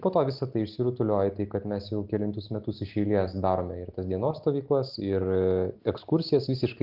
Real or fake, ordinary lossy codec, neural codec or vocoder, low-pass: real; Opus, 24 kbps; none; 5.4 kHz